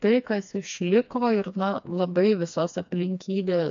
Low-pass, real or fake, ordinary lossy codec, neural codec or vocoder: 7.2 kHz; fake; AAC, 64 kbps; codec, 16 kHz, 2 kbps, FreqCodec, smaller model